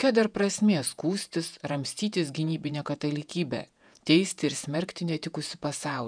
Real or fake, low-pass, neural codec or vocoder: fake; 9.9 kHz; vocoder, 48 kHz, 128 mel bands, Vocos